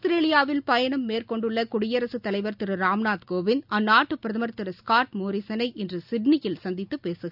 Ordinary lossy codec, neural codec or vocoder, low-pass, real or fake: none; none; 5.4 kHz; real